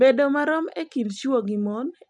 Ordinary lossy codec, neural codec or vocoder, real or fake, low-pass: none; none; real; 10.8 kHz